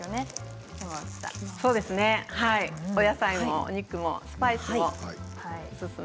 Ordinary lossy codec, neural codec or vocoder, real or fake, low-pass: none; none; real; none